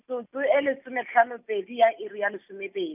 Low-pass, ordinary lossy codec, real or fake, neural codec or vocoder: 3.6 kHz; none; real; none